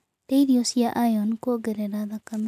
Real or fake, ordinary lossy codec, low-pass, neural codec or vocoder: real; none; 14.4 kHz; none